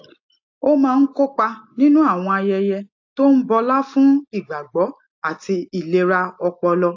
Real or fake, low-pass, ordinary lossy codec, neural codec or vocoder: real; 7.2 kHz; AAC, 48 kbps; none